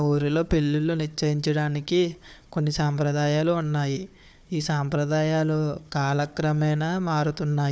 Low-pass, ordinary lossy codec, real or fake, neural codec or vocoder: none; none; fake; codec, 16 kHz, 4 kbps, FunCodec, trained on Chinese and English, 50 frames a second